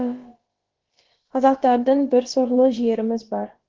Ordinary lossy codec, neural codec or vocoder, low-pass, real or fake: Opus, 16 kbps; codec, 16 kHz, about 1 kbps, DyCAST, with the encoder's durations; 7.2 kHz; fake